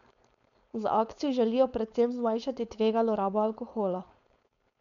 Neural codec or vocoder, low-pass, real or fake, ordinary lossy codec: codec, 16 kHz, 4.8 kbps, FACodec; 7.2 kHz; fake; none